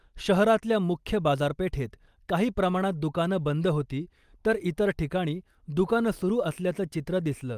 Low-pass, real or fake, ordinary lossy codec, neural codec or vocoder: 14.4 kHz; real; Opus, 32 kbps; none